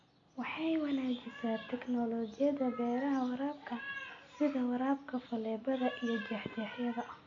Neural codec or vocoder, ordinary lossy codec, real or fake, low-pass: none; MP3, 48 kbps; real; 7.2 kHz